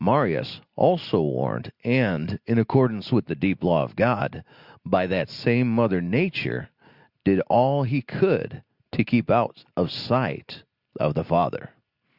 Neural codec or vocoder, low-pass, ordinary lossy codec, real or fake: none; 5.4 kHz; Opus, 64 kbps; real